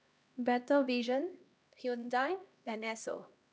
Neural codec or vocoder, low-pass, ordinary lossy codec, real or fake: codec, 16 kHz, 1 kbps, X-Codec, HuBERT features, trained on LibriSpeech; none; none; fake